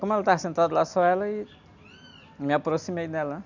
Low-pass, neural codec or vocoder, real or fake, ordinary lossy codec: 7.2 kHz; none; real; none